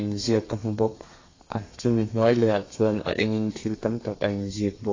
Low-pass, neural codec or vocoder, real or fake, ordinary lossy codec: 7.2 kHz; codec, 32 kHz, 1.9 kbps, SNAC; fake; AAC, 32 kbps